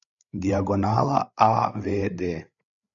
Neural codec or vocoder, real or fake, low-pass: codec, 16 kHz, 8 kbps, FreqCodec, larger model; fake; 7.2 kHz